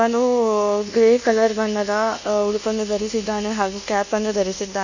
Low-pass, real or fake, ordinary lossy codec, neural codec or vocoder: 7.2 kHz; fake; none; codec, 24 kHz, 1.2 kbps, DualCodec